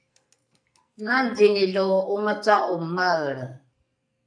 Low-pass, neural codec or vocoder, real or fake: 9.9 kHz; codec, 44.1 kHz, 2.6 kbps, SNAC; fake